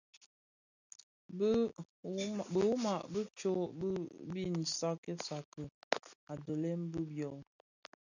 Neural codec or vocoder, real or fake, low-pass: none; real; 7.2 kHz